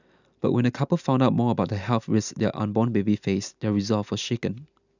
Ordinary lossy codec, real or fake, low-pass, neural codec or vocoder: none; real; 7.2 kHz; none